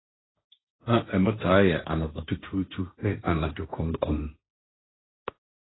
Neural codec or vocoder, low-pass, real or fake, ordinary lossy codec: codec, 16 kHz, 1.1 kbps, Voila-Tokenizer; 7.2 kHz; fake; AAC, 16 kbps